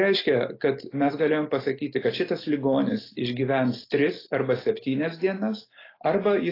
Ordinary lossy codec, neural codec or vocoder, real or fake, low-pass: AAC, 24 kbps; none; real; 5.4 kHz